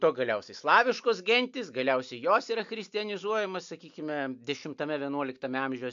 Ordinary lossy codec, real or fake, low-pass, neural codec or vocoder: MP3, 64 kbps; real; 7.2 kHz; none